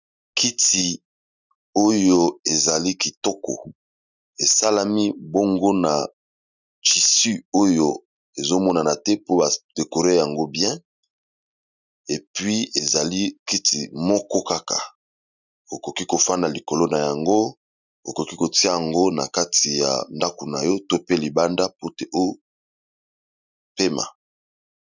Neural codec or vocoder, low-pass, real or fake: none; 7.2 kHz; real